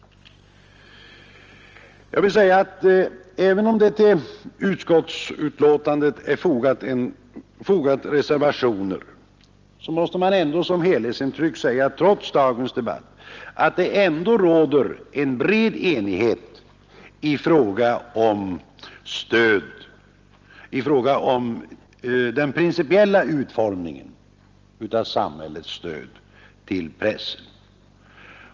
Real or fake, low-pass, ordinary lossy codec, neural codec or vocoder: real; 7.2 kHz; Opus, 24 kbps; none